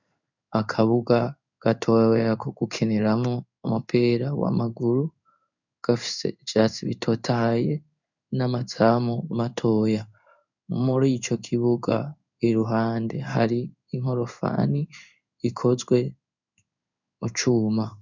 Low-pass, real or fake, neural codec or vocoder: 7.2 kHz; fake; codec, 16 kHz in and 24 kHz out, 1 kbps, XY-Tokenizer